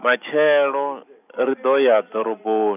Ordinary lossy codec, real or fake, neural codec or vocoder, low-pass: none; real; none; 3.6 kHz